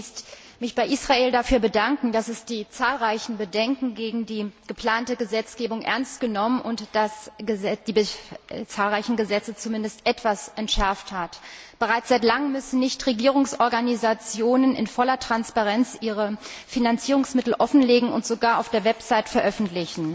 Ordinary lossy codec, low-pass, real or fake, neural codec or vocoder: none; none; real; none